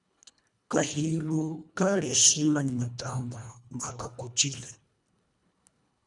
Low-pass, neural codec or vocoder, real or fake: 10.8 kHz; codec, 24 kHz, 1.5 kbps, HILCodec; fake